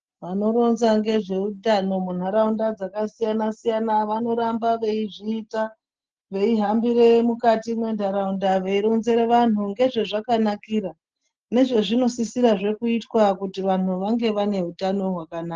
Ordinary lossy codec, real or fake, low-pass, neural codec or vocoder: Opus, 16 kbps; real; 7.2 kHz; none